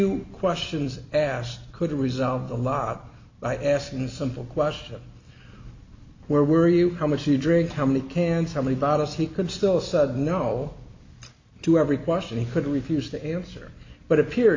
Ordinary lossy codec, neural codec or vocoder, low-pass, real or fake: MP3, 48 kbps; none; 7.2 kHz; real